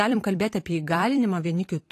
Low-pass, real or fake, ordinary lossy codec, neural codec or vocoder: 14.4 kHz; fake; AAC, 48 kbps; vocoder, 44.1 kHz, 128 mel bands every 256 samples, BigVGAN v2